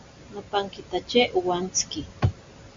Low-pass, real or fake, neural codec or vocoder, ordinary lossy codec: 7.2 kHz; real; none; MP3, 48 kbps